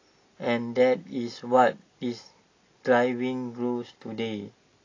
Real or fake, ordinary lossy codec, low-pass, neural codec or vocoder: real; AAC, 32 kbps; 7.2 kHz; none